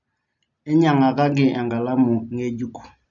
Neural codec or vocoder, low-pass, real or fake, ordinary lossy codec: none; 7.2 kHz; real; none